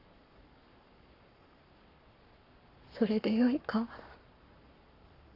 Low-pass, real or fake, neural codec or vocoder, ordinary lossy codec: 5.4 kHz; fake; codec, 44.1 kHz, 7.8 kbps, Pupu-Codec; none